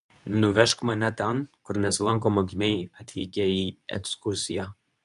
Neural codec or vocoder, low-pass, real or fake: codec, 24 kHz, 0.9 kbps, WavTokenizer, medium speech release version 2; 10.8 kHz; fake